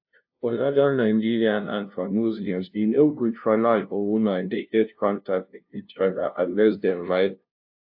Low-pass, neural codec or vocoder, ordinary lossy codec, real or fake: 5.4 kHz; codec, 16 kHz, 0.5 kbps, FunCodec, trained on LibriTTS, 25 frames a second; AAC, 48 kbps; fake